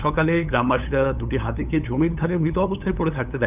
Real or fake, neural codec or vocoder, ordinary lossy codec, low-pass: fake; codec, 16 kHz, 8 kbps, FunCodec, trained on LibriTTS, 25 frames a second; none; 3.6 kHz